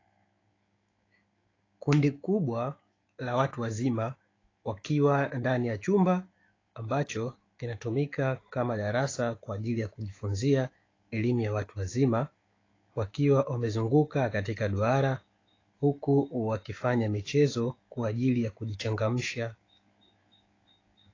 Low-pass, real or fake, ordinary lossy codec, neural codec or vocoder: 7.2 kHz; fake; AAC, 32 kbps; autoencoder, 48 kHz, 128 numbers a frame, DAC-VAE, trained on Japanese speech